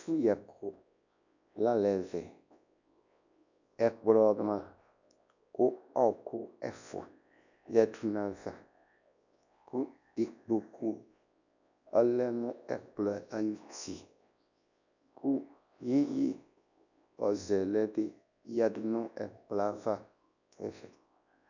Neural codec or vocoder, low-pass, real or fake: codec, 24 kHz, 0.9 kbps, WavTokenizer, large speech release; 7.2 kHz; fake